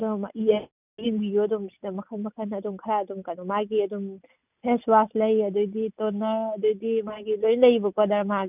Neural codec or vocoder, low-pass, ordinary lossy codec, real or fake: none; 3.6 kHz; none; real